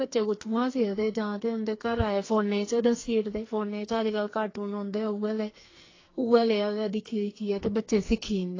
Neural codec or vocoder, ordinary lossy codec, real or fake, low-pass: codec, 44.1 kHz, 2.6 kbps, SNAC; AAC, 32 kbps; fake; 7.2 kHz